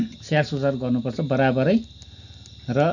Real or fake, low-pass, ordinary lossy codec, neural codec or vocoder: real; 7.2 kHz; none; none